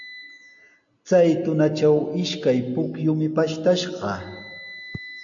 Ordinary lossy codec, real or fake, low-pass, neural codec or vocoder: AAC, 64 kbps; real; 7.2 kHz; none